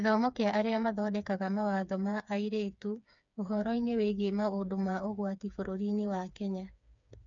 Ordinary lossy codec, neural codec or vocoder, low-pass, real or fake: none; codec, 16 kHz, 4 kbps, FreqCodec, smaller model; 7.2 kHz; fake